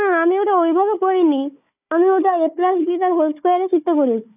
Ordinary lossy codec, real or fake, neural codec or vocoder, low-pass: none; fake; codec, 16 kHz, 4 kbps, FunCodec, trained on Chinese and English, 50 frames a second; 3.6 kHz